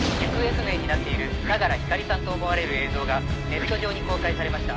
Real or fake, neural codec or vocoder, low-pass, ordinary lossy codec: real; none; none; none